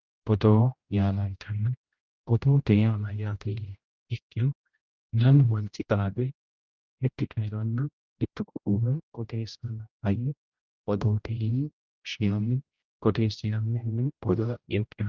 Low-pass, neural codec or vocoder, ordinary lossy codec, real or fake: 7.2 kHz; codec, 16 kHz, 0.5 kbps, X-Codec, HuBERT features, trained on general audio; Opus, 24 kbps; fake